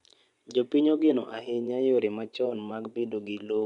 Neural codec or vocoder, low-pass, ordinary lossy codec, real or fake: vocoder, 24 kHz, 100 mel bands, Vocos; 10.8 kHz; none; fake